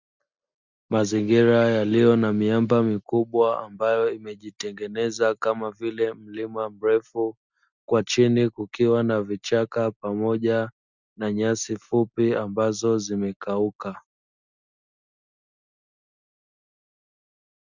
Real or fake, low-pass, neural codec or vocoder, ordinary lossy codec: real; 7.2 kHz; none; Opus, 64 kbps